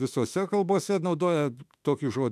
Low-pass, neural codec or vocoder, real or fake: 14.4 kHz; autoencoder, 48 kHz, 32 numbers a frame, DAC-VAE, trained on Japanese speech; fake